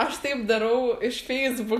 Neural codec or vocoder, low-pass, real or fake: none; 14.4 kHz; real